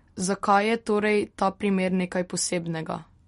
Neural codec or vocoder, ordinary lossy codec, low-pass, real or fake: none; MP3, 48 kbps; 19.8 kHz; real